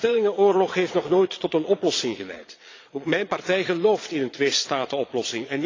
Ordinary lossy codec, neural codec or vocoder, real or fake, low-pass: AAC, 32 kbps; vocoder, 22.05 kHz, 80 mel bands, Vocos; fake; 7.2 kHz